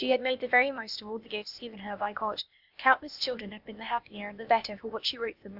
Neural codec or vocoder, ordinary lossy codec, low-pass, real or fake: codec, 16 kHz, 0.8 kbps, ZipCodec; Opus, 64 kbps; 5.4 kHz; fake